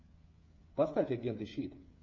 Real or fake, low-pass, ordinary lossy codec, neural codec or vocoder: fake; 7.2 kHz; MP3, 48 kbps; codec, 16 kHz, 8 kbps, FreqCodec, smaller model